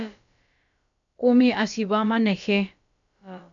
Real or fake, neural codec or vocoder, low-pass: fake; codec, 16 kHz, about 1 kbps, DyCAST, with the encoder's durations; 7.2 kHz